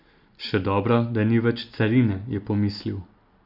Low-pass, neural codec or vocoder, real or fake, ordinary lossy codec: 5.4 kHz; none; real; none